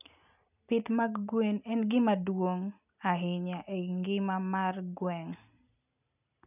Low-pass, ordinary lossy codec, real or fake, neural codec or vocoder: 3.6 kHz; none; real; none